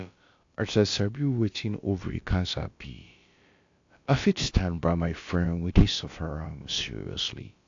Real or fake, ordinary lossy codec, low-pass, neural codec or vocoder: fake; AAC, 48 kbps; 7.2 kHz; codec, 16 kHz, about 1 kbps, DyCAST, with the encoder's durations